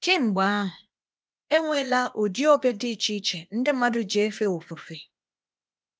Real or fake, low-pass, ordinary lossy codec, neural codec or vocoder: fake; none; none; codec, 16 kHz, 0.8 kbps, ZipCodec